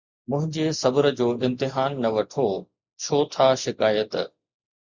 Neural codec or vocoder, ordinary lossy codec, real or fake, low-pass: none; Opus, 64 kbps; real; 7.2 kHz